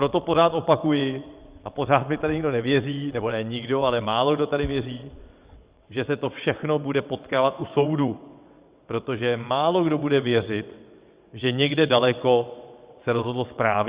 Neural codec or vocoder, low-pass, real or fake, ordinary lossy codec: vocoder, 22.05 kHz, 80 mel bands, WaveNeXt; 3.6 kHz; fake; Opus, 64 kbps